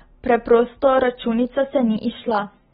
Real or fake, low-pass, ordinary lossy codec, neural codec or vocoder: real; 19.8 kHz; AAC, 16 kbps; none